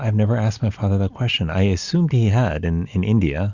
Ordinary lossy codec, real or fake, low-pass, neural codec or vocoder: Opus, 64 kbps; real; 7.2 kHz; none